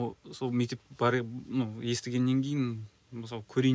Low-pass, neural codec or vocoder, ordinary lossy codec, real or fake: none; none; none; real